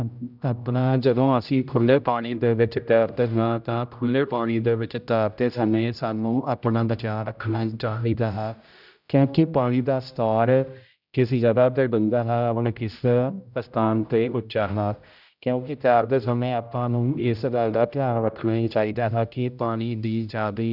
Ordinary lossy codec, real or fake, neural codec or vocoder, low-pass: none; fake; codec, 16 kHz, 0.5 kbps, X-Codec, HuBERT features, trained on general audio; 5.4 kHz